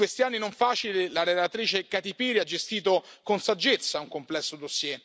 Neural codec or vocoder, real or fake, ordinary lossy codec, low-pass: none; real; none; none